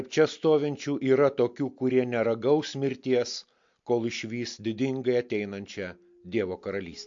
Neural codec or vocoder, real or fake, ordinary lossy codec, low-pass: none; real; MP3, 48 kbps; 7.2 kHz